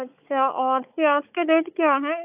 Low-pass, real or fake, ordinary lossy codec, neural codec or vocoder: 3.6 kHz; fake; AAC, 32 kbps; codec, 16 kHz, 16 kbps, FunCodec, trained on Chinese and English, 50 frames a second